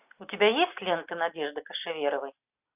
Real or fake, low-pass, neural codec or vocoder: real; 3.6 kHz; none